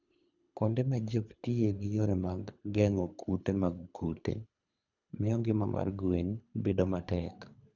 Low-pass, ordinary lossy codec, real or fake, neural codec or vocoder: 7.2 kHz; none; fake; codec, 24 kHz, 3 kbps, HILCodec